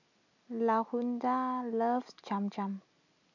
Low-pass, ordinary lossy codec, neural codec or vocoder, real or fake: 7.2 kHz; none; none; real